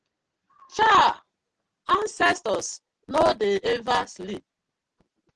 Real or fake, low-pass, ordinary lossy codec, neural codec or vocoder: real; 10.8 kHz; Opus, 24 kbps; none